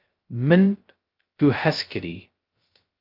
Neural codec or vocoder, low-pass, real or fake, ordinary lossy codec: codec, 16 kHz, 0.2 kbps, FocalCodec; 5.4 kHz; fake; Opus, 32 kbps